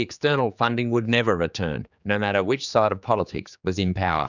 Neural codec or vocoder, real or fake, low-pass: codec, 16 kHz, 4 kbps, X-Codec, HuBERT features, trained on general audio; fake; 7.2 kHz